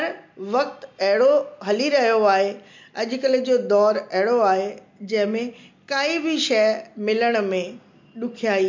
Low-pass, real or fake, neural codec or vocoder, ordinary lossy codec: 7.2 kHz; real; none; MP3, 48 kbps